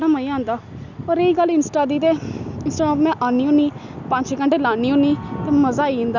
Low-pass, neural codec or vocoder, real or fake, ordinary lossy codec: 7.2 kHz; none; real; none